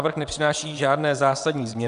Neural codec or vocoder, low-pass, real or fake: vocoder, 22.05 kHz, 80 mel bands, WaveNeXt; 9.9 kHz; fake